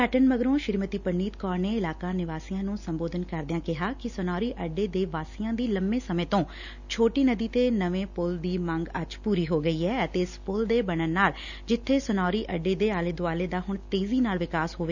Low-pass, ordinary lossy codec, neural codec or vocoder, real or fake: 7.2 kHz; none; none; real